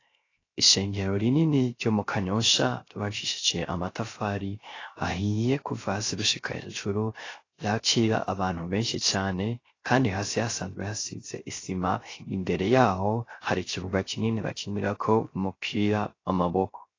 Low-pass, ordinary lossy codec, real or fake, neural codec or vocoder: 7.2 kHz; AAC, 32 kbps; fake; codec, 16 kHz, 0.3 kbps, FocalCodec